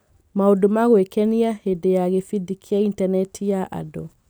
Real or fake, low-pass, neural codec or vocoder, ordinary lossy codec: real; none; none; none